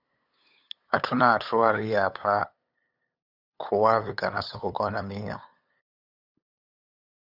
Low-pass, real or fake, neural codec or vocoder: 5.4 kHz; fake; codec, 16 kHz, 8 kbps, FunCodec, trained on LibriTTS, 25 frames a second